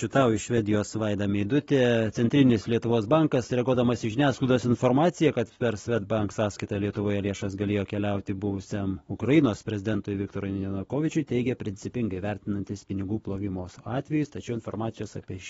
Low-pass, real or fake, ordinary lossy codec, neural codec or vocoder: 19.8 kHz; real; AAC, 24 kbps; none